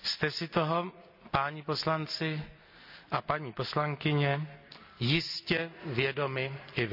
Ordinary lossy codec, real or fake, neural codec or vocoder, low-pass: AAC, 48 kbps; real; none; 5.4 kHz